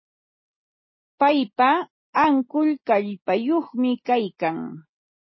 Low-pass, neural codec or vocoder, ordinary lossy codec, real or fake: 7.2 kHz; none; MP3, 24 kbps; real